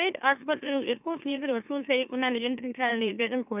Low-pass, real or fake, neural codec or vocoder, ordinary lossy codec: 3.6 kHz; fake; autoencoder, 44.1 kHz, a latent of 192 numbers a frame, MeloTTS; none